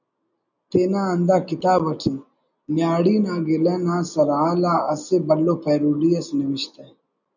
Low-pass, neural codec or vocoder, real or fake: 7.2 kHz; none; real